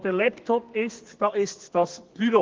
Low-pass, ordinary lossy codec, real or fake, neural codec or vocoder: 7.2 kHz; Opus, 16 kbps; fake; codec, 32 kHz, 1.9 kbps, SNAC